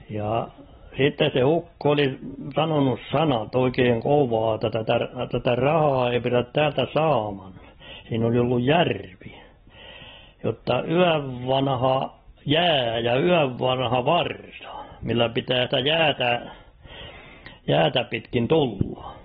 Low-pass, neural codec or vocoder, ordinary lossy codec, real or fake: 10.8 kHz; none; AAC, 16 kbps; real